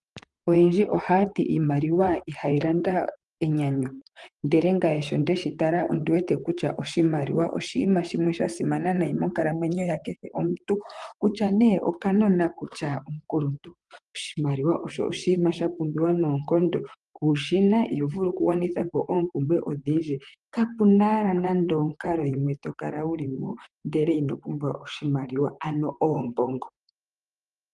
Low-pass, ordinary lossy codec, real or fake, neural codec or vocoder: 10.8 kHz; Opus, 32 kbps; fake; vocoder, 44.1 kHz, 128 mel bands, Pupu-Vocoder